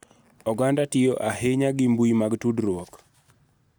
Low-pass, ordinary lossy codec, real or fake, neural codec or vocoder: none; none; real; none